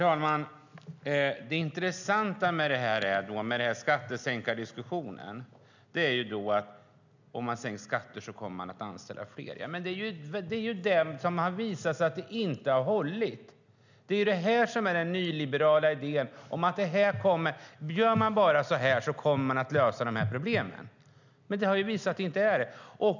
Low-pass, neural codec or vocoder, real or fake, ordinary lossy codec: 7.2 kHz; none; real; none